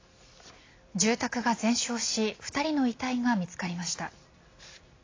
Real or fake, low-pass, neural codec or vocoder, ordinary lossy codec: real; 7.2 kHz; none; AAC, 32 kbps